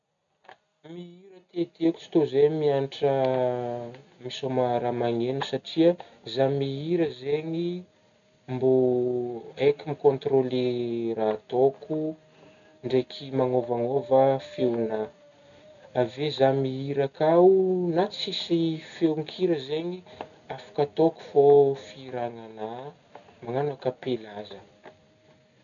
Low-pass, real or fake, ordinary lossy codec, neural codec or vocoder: 7.2 kHz; real; none; none